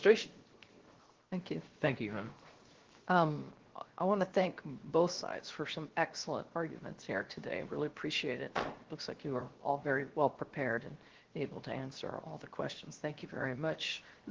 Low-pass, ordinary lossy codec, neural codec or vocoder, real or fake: 7.2 kHz; Opus, 16 kbps; codec, 16 kHz, 0.7 kbps, FocalCodec; fake